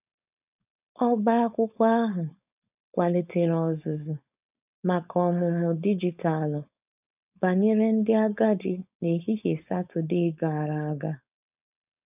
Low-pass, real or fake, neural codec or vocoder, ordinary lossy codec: 3.6 kHz; fake; codec, 16 kHz, 4.8 kbps, FACodec; none